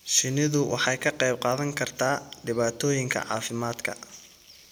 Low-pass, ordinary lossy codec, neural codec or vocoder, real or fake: none; none; none; real